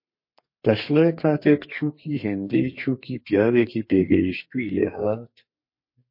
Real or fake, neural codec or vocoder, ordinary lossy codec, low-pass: fake; codec, 32 kHz, 1.9 kbps, SNAC; MP3, 32 kbps; 5.4 kHz